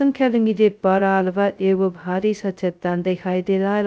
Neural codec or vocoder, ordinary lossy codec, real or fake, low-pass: codec, 16 kHz, 0.2 kbps, FocalCodec; none; fake; none